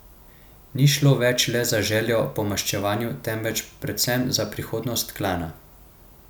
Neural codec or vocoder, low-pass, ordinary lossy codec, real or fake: none; none; none; real